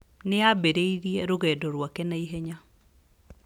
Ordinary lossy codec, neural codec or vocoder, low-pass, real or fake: none; none; 19.8 kHz; real